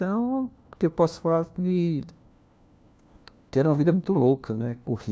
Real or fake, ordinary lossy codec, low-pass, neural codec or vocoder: fake; none; none; codec, 16 kHz, 1 kbps, FunCodec, trained on LibriTTS, 50 frames a second